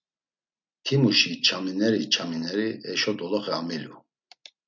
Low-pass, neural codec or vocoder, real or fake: 7.2 kHz; none; real